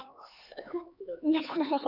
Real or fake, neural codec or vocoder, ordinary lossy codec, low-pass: fake; codec, 16 kHz, 4 kbps, X-Codec, HuBERT features, trained on LibriSpeech; none; 5.4 kHz